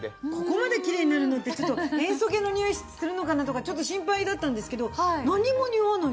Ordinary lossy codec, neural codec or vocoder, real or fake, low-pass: none; none; real; none